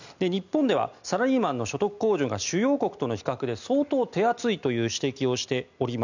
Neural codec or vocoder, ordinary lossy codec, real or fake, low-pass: none; none; real; 7.2 kHz